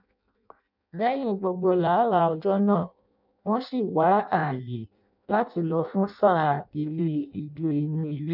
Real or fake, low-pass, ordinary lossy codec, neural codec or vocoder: fake; 5.4 kHz; none; codec, 16 kHz in and 24 kHz out, 0.6 kbps, FireRedTTS-2 codec